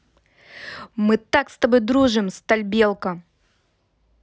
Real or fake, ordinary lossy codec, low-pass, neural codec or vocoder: real; none; none; none